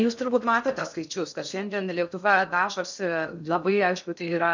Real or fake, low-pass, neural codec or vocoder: fake; 7.2 kHz; codec, 16 kHz in and 24 kHz out, 0.6 kbps, FocalCodec, streaming, 2048 codes